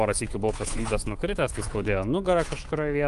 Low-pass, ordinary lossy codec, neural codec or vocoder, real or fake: 10.8 kHz; Opus, 24 kbps; codec, 24 kHz, 3.1 kbps, DualCodec; fake